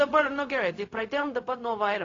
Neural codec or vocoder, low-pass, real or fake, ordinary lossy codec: codec, 16 kHz, 0.4 kbps, LongCat-Audio-Codec; 7.2 kHz; fake; AAC, 32 kbps